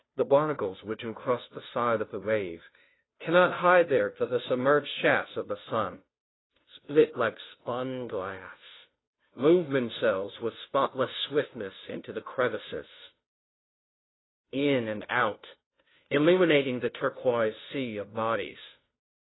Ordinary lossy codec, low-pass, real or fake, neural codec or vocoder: AAC, 16 kbps; 7.2 kHz; fake; codec, 16 kHz, 0.5 kbps, FunCodec, trained on Chinese and English, 25 frames a second